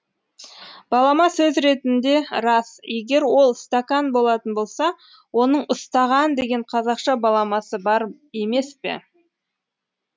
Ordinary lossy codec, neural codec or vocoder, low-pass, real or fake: none; none; none; real